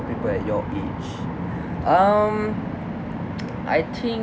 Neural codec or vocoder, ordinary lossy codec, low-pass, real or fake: none; none; none; real